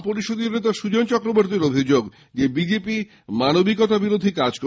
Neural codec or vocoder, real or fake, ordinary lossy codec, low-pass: none; real; none; none